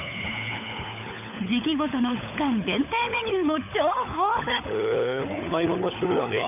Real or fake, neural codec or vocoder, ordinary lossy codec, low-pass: fake; codec, 16 kHz, 16 kbps, FunCodec, trained on LibriTTS, 50 frames a second; none; 3.6 kHz